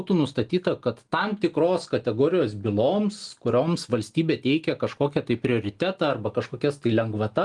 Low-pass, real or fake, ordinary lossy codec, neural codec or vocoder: 10.8 kHz; real; Opus, 24 kbps; none